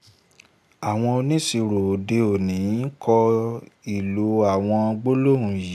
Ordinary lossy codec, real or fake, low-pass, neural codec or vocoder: AAC, 96 kbps; real; 14.4 kHz; none